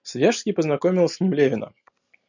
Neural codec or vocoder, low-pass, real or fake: none; 7.2 kHz; real